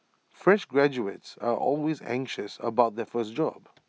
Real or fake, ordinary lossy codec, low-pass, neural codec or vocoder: real; none; none; none